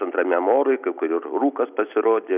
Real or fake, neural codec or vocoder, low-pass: real; none; 3.6 kHz